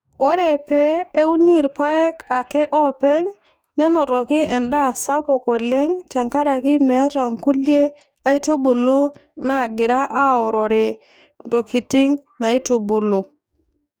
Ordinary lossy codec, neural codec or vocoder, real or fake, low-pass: none; codec, 44.1 kHz, 2.6 kbps, DAC; fake; none